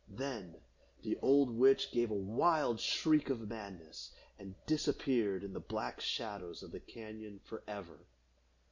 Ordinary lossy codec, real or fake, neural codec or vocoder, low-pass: MP3, 64 kbps; real; none; 7.2 kHz